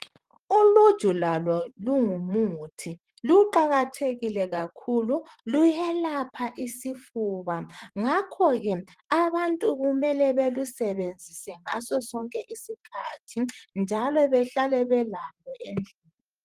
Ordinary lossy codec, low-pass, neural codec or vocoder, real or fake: Opus, 24 kbps; 14.4 kHz; vocoder, 44.1 kHz, 128 mel bands, Pupu-Vocoder; fake